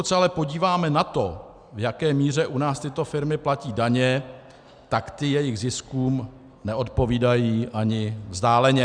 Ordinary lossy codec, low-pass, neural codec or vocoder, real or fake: Opus, 64 kbps; 9.9 kHz; none; real